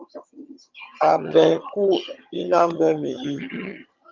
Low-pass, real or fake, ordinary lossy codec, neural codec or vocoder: 7.2 kHz; fake; Opus, 24 kbps; vocoder, 22.05 kHz, 80 mel bands, HiFi-GAN